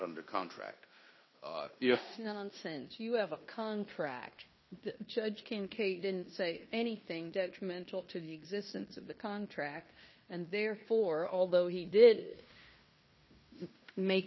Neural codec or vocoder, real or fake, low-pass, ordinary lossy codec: codec, 16 kHz in and 24 kHz out, 0.9 kbps, LongCat-Audio-Codec, fine tuned four codebook decoder; fake; 7.2 kHz; MP3, 24 kbps